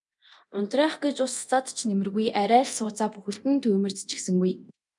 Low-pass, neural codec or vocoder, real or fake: 10.8 kHz; codec, 24 kHz, 0.9 kbps, DualCodec; fake